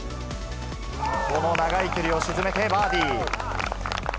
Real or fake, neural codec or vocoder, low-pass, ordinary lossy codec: real; none; none; none